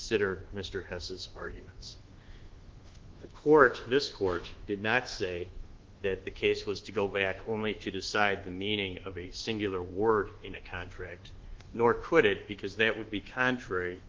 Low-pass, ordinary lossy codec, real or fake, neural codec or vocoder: 7.2 kHz; Opus, 16 kbps; fake; codec, 24 kHz, 1.2 kbps, DualCodec